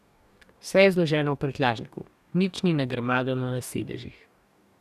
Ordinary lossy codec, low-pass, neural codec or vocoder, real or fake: none; 14.4 kHz; codec, 44.1 kHz, 2.6 kbps, DAC; fake